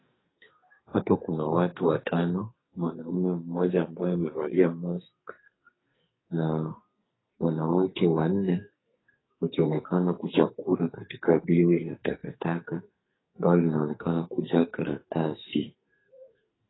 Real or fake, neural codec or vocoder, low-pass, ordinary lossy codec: fake; codec, 44.1 kHz, 2.6 kbps, SNAC; 7.2 kHz; AAC, 16 kbps